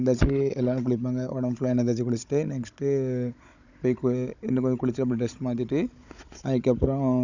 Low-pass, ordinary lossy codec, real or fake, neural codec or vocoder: 7.2 kHz; none; fake; codec, 16 kHz, 16 kbps, FunCodec, trained on Chinese and English, 50 frames a second